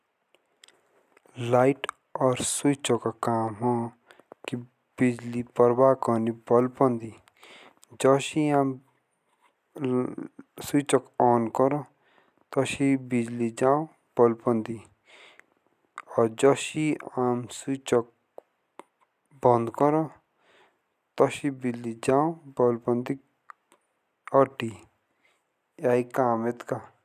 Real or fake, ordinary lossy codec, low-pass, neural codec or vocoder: real; none; 14.4 kHz; none